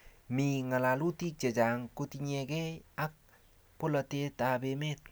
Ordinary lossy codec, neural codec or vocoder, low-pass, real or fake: none; none; none; real